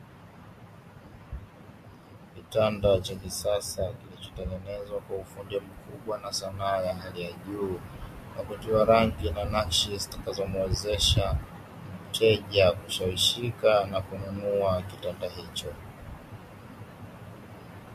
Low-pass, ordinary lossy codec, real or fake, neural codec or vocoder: 14.4 kHz; MP3, 64 kbps; real; none